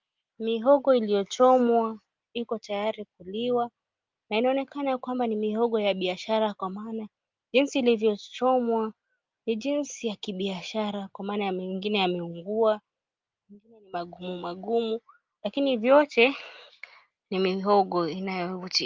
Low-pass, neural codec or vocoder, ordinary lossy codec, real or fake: 7.2 kHz; none; Opus, 24 kbps; real